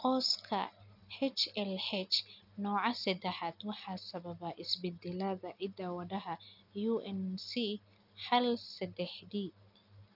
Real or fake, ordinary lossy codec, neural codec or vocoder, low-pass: real; none; none; 5.4 kHz